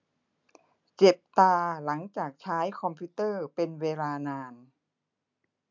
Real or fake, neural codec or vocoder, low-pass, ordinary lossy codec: real; none; 7.2 kHz; MP3, 64 kbps